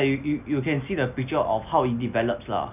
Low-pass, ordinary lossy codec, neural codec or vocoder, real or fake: 3.6 kHz; none; none; real